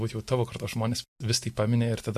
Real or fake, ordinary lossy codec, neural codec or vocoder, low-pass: real; MP3, 64 kbps; none; 14.4 kHz